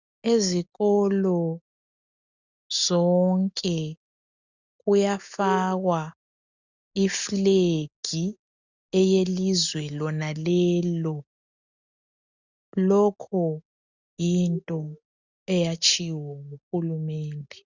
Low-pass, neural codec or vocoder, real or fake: 7.2 kHz; none; real